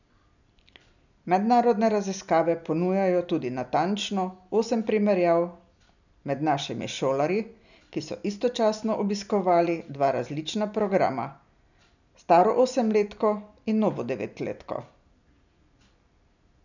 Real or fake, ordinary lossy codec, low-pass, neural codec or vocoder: real; none; 7.2 kHz; none